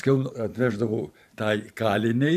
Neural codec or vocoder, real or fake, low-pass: vocoder, 44.1 kHz, 128 mel bands every 512 samples, BigVGAN v2; fake; 14.4 kHz